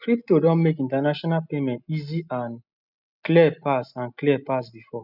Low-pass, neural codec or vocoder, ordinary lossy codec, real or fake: 5.4 kHz; none; none; real